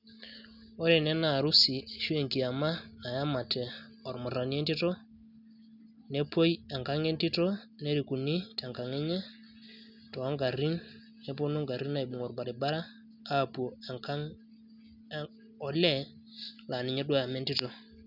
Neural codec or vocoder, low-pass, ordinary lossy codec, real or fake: none; 5.4 kHz; none; real